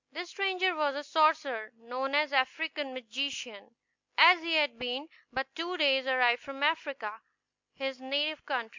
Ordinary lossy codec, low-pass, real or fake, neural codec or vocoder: MP3, 64 kbps; 7.2 kHz; real; none